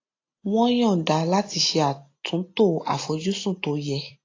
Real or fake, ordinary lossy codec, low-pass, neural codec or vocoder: real; AAC, 32 kbps; 7.2 kHz; none